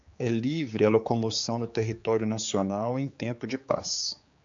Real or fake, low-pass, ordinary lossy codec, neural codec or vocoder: fake; 7.2 kHz; MP3, 64 kbps; codec, 16 kHz, 4 kbps, X-Codec, HuBERT features, trained on general audio